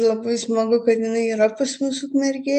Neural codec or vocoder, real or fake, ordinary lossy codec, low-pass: none; real; AAC, 96 kbps; 10.8 kHz